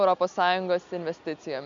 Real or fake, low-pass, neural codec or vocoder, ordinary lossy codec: real; 7.2 kHz; none; AAC, 64 kbps